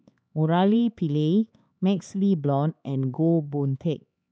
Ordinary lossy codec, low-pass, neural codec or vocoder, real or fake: none; none; codec, 16 kHz, 4 kbps, X-Codec, HuBERT features, trained on LibriSpeech; fake